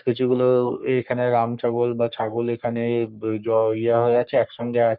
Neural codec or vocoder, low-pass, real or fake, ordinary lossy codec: codec, 44.1 kHz, 3.4 kbps, Pupu-Codec; 5.4 kHz; fake; none